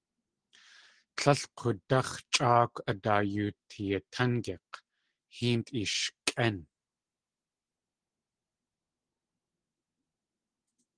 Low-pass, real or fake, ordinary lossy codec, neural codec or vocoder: 9.9 kHz; real; Opus, 16 kbps; none